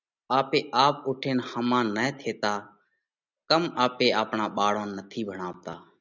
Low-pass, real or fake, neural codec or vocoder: 7.2 kHz; real; none